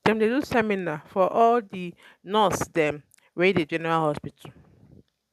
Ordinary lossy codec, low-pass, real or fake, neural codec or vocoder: none; 14.4 kHz; real; none